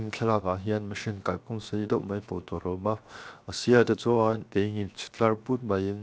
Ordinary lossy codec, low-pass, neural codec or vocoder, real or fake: none; none; codec, 16 kHz, 0.7 kbps, FocalCodec; fake